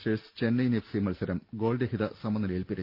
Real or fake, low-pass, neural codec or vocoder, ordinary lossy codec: real; 5.4 kHz; none; Opus, 32 kbps